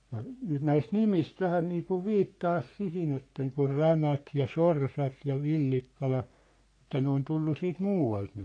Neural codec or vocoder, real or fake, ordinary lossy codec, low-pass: codec, 44.1 kHz, 3.4 kbps, Pupu-Codec; fake; AAC, 48 kbps; 9.9 kHz